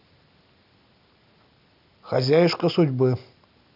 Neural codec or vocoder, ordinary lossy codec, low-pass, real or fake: none; none; 5.4 kHz; real